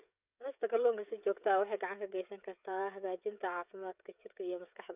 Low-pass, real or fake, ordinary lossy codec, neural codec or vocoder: 3.6 kHz; fake; none; codec, 16 kHz, 16 kbps, FreqCodec, smaller model